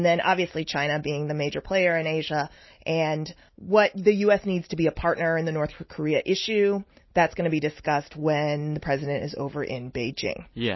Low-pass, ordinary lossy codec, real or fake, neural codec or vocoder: 7.2 kHz; MP3, 24 kbps; real; none